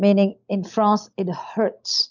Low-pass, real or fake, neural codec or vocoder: 7.2 kHz; real; none